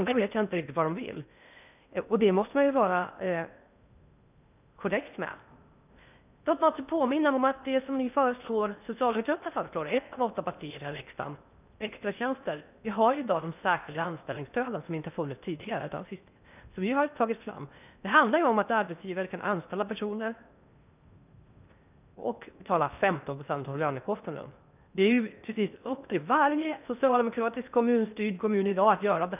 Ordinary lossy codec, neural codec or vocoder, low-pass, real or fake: none; codec, 16 kHz in and 24 kHz out, 0.6 kbps, FocalCodec, streaming, 4096 codes; 3.6 kHz; fake